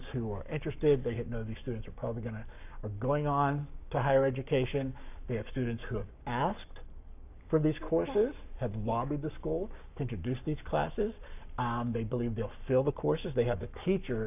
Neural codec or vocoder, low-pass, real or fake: codec, 44.1 kHz, 7.8 kbps, Pupu-Codec; 3.6 kHz; fake